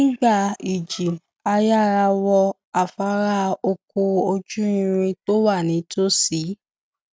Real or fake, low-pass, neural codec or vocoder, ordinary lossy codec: real; none; none; none